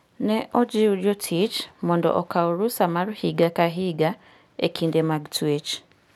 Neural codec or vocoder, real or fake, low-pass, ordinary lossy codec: none; real; 19.8 kHz; none